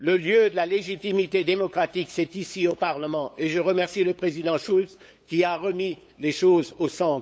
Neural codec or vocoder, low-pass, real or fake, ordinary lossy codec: codec, 16 kHz, 8 kbps, FunCodec, trained on LibriTTS, 25 frames a second; none; fake; none